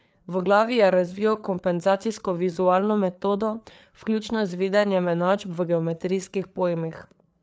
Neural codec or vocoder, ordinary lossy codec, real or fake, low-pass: codec, 16 kHz, 4 kbps, FreqCodec, larger model; none; fake; none